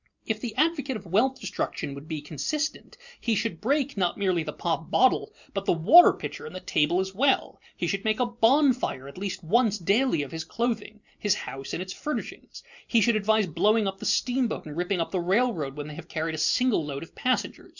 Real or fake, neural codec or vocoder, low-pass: real; none; 7.2 kHz